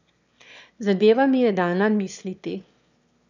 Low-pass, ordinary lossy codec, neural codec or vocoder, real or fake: 7.2 kHz; none; autoencoder, 22.05 kHz, a latent of 192 numbers a frame, VITS, trained on one speaker; fake